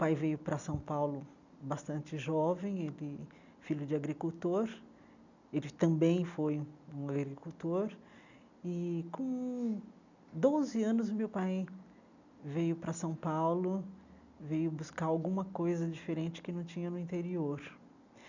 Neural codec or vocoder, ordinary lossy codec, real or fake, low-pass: none; none; real; 7.2 kHz